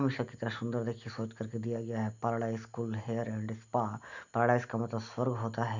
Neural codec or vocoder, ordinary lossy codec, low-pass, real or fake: none; none; 7.2 kHz; real